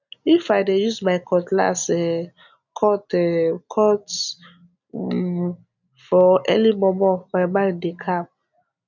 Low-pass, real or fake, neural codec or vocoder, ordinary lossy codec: 7.2 kHz; real; none; none